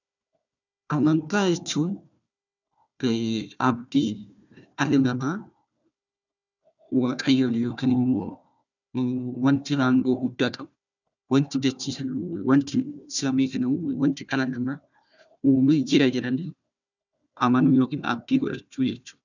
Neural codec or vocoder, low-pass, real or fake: codec, 16 kHz, 1 kbps, FunCodec, trained on Chinese and English, 50 frames a second; 7.2 kHz; fake